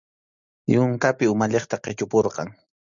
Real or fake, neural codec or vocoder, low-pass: real; none; 7.2 kHz